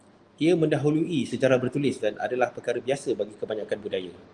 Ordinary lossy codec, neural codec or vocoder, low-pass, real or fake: Opus, 24 kbps; none; 10.8 kHz; real